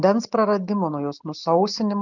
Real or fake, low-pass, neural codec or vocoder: real; 7.2 kHz; none